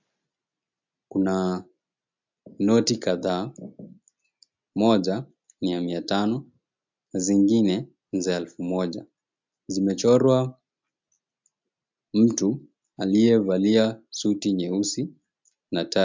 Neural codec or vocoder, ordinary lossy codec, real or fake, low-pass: none; MP3, 64 kbps; real; 7.2 kHz